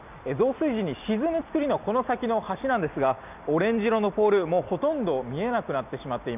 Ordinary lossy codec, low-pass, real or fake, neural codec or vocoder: none; 3.6 kHz; real; none